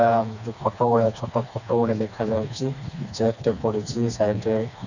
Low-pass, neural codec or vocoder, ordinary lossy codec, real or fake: 7.2 kHz; codec, 16 kHz, 2 kbps, FreqCodec, smaller model; none; fake